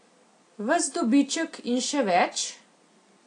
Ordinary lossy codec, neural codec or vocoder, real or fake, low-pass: AAC, 48 kbps; none; real; 9.9 kHz